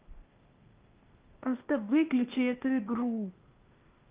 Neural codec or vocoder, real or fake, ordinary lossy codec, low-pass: codec, 16 kHz, 0.8 kbps, ZipCodec; fake; Opus, 24 kbps; 3.6 kHz